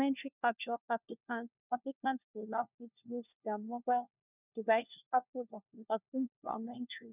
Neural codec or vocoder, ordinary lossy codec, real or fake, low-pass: codec, 16 kHz, 1 kbps, FunCodec, trained on LibriTTS, 50 frames a second; none; fake; 3.6 kHz